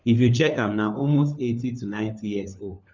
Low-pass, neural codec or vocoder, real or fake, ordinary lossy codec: 7.2 kHz; codec, 16 kHz, 4 kbps, FunCodec, trained on LibriTTS, 50 frames a second; fake; none